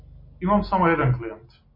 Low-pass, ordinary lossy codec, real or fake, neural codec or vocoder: 5.4 kHz; MP3, 32 kbps; real; none